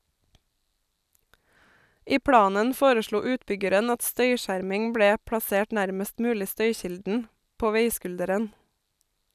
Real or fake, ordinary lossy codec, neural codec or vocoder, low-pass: real; none; none; 14.4 kHz